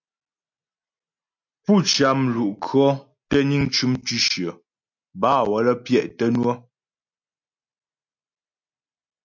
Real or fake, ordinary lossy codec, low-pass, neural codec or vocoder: real; MP3, 64 kbps; 7.2 kHz; none